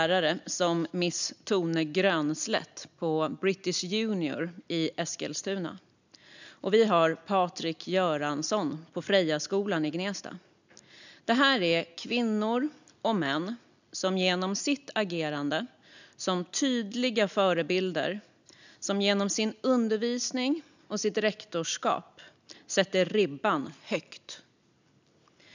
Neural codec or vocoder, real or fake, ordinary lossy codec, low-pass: none; real; none; 7.2 kHz